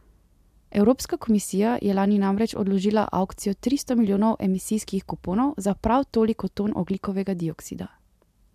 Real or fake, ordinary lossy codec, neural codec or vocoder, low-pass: real; MP3, 96 kbps; none; 14.4 kHz